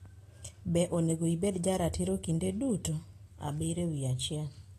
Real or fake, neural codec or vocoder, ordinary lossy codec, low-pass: real; none; AAC, 48 kbps; 14.4 kHz